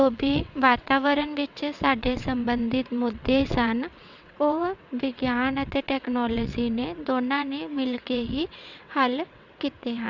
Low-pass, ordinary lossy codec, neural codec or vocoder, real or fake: 7.2 kHz; none; vocoder, 22.05 kHz, 80 mel bands, WaveNeXt; fake